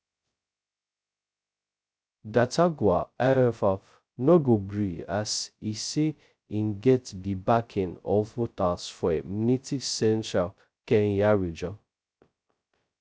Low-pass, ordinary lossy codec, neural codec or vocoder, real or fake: none; none; codec, 16 kHz, 0.2 kbps, FocalCodec; fake